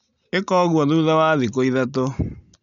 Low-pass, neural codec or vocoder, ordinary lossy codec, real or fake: 7.2 kHz; none; none; real